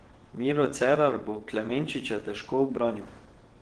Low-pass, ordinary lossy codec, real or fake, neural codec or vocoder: 9.9 kHz; Opus, 16 kbps; fake; vocoder, 22.05 kHz, 80 mel bands, WaveNeXt